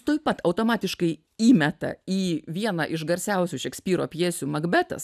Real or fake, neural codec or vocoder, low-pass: real; none; 14.4 kHz